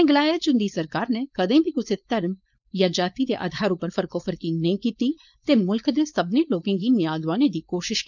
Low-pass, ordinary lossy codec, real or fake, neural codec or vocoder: 7.2 kHz; none; fake; codec, 16 kHz, 4.8 kbps, FACodec